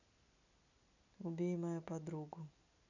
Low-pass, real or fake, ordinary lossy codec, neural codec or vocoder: 7.2 kHz; real; none; none